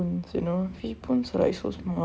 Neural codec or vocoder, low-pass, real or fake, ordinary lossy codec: none; none; real; none